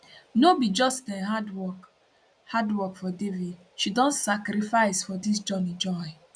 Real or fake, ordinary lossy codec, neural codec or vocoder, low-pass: real; none; none; 9.9 kHz